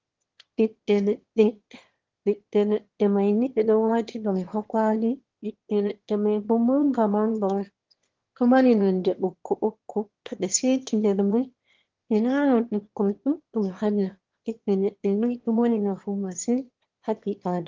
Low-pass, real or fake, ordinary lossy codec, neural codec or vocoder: 7.2 kHz; fake; Opus, 16 kbps; autoencoder, 22.05 kHz, a latent of 192 numbers a frame, VITS, trained on one speaker